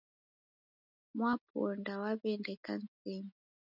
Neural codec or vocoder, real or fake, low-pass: none; real; 5.4 kHz